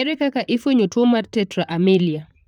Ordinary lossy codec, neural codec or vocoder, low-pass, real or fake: none; vocoder, 44.1 kHz, 128 mel bands, Pupu-Vocoder; 19.8 kHz; fake